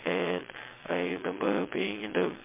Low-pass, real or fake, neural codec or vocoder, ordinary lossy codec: 3.6 kHz; fake; vocoder, 22.05 kHz, 80 mel bands, WaveNeXt; MP3, 24 kbps